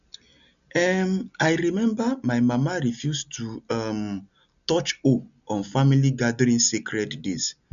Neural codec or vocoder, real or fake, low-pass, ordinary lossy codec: none; real; 7.2 kHz; none